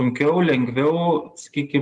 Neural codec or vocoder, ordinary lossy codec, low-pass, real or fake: none; Opus, 32 kbps; 10.8 kHz; real